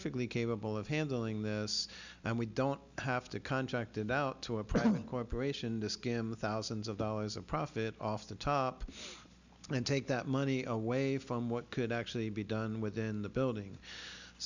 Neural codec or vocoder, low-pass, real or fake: none; 7.2 kHz; real